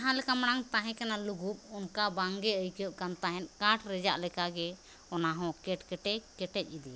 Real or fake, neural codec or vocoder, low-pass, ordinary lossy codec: real; none; none; none